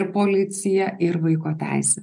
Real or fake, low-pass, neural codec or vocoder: real; 10.8 kHz; none